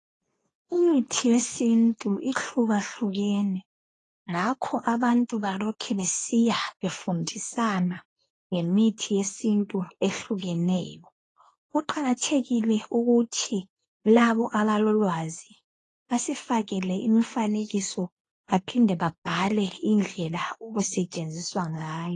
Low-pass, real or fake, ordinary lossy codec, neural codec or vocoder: 10.8 kHz; fake; AAC, 32 kbps; codec, 24 kHz, 0.9 kbps, WavTokenizer, medium speech release version 2